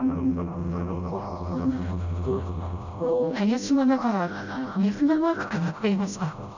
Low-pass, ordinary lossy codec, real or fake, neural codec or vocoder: 7.2 kHz; none; fake; codec, 16 kHz, 0.5 kbps, FreqCodec, smaller model